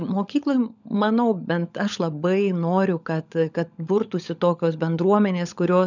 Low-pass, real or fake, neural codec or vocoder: 7.2 kHz; fake; codec, 16 kHz, 16 kbps, FunCodec, trained on LibriTTS, 50 frames a second